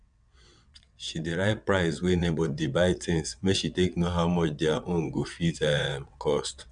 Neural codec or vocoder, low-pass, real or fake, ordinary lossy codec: vocoder, 22.05 kHz, 80 mel bands, WaveNeXt; 9.9 kHz; fake; none